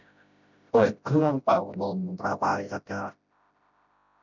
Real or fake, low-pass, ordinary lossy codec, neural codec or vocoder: fake; 7.2 kHz; none; codec, 16 kHz, 0.5 kbps, FreqCodec, smaller model